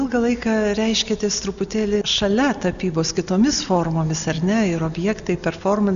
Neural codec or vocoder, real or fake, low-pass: none; real; 7.2 kHz